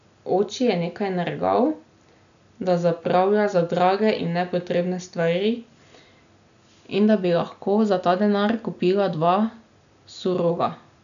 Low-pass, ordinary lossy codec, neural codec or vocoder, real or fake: 7.2 kHz; none; none; real